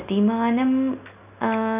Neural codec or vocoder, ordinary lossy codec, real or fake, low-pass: none; none; real; 3.6 kHz